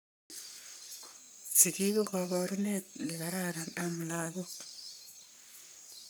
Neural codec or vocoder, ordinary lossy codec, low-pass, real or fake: codec, 44.1 kHz, 3.4 kbps, Pupu-Codec; none; none; fake